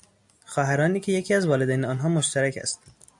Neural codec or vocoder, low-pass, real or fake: none; 10.8 kHz; real